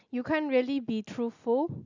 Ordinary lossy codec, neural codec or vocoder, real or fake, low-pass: none; none; real; 7.2 kHz